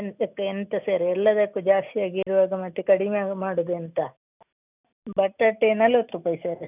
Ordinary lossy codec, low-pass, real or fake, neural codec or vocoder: none; 3.6 kHz; real; none